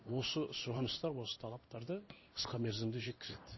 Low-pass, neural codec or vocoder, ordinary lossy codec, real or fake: 7.2 kHz; codec, 16 kHz in and 24 kHz out, 1 kbps, XY-Tokenizer; MP3, 24 kbps; fake